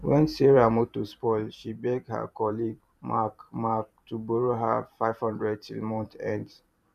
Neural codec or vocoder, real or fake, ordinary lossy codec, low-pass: vocoder, 44.1 kHz, 128 mel bands every 512 samples, BigVGAN v2; fake; none; 14.4 kHz